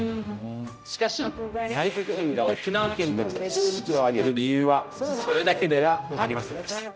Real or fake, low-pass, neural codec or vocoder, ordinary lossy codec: fake; none; codec, 16 kHz, 0.5 kbps, X-Codec, HuBERT features, trained on general audio; none